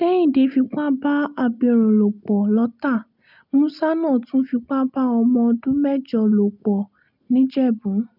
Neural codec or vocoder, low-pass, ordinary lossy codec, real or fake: none; 5.4 kHz; none; real